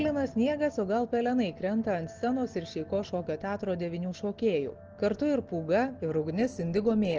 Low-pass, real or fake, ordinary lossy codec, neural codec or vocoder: 7.2 kHz; real; Opus, 16 kbps; none